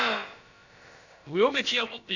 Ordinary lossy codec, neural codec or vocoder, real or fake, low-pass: MP3, 64 kbps; codec, 16 kHz, about 1 kbps, DyCAST, with the encoder's durations; fake; 7.2 kHz